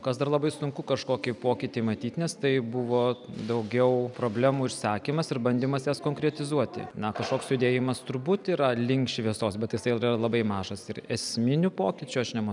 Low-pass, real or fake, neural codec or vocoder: 10.8 kHz; real; none